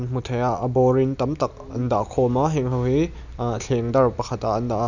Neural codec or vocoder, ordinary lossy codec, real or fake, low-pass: none; none; real; 7.2 kHz